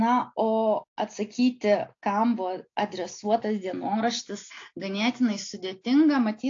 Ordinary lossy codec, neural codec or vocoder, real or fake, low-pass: AAC, 48 kbps; none; real; 7.2 kHz